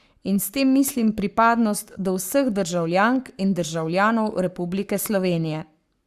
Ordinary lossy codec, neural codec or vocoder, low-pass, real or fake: Opus, 64 kbps; codec, 44.1 kHz, 7.8 kbps, Pupu-Codec; 14.4 kHz; fake